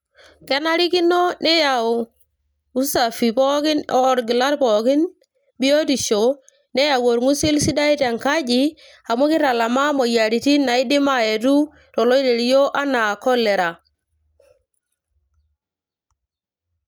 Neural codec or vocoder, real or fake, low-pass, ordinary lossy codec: none; real; none; none